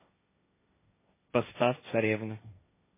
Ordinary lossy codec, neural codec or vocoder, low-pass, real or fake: MP3, 16 kbps; codec, 16 kHz, 1.1 kbps, Voila-Tokenizer; 3.6 kHz; fake